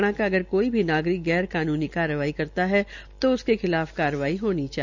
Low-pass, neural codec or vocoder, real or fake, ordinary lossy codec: 7.2 kHz; none; real; none